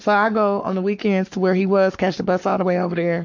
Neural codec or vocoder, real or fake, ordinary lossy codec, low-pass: codec, 44.1 kHz, 7.8 kbps, Pupu-Codec; fake; MP3, 64 kbps; 7.2 kHz